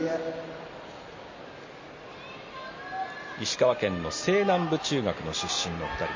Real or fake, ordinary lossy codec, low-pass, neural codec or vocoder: real; MP3, 32 kbps; 7.2 kHz; none